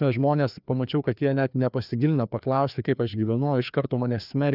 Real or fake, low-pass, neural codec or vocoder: fake; 5.4 kHz; codec, 16 kHz, 2 kbps, FreqCodec, larger model